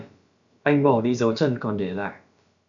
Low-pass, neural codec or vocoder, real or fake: 7.2 kHz; codec, 16 kHz, about 1 kbps, DyCAST, with the encoder's durations; fake